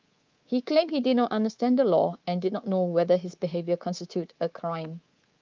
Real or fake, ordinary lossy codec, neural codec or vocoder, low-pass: fake; Opus, 24 kbps; codec, 24 kHz, 3.1 kbps, DualCodec; 7.2 kHz